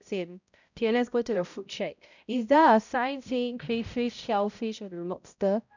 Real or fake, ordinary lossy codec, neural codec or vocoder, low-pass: fake; none; codec, 16 kHz, 0.5 kbps, X-Codec, HuBERT features, trained on balanced general audio; 7.2 kHz